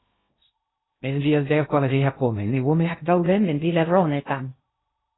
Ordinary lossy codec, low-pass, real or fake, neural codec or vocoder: AAC, 16 kbps; 7.2 kHz; fake; codec, 16 kHz in and 24 kHz out, 0.6 kbps, FocalCodec, streaming, 2048 codes